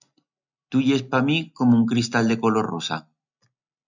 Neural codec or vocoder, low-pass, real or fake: none; 7.2 kHz; real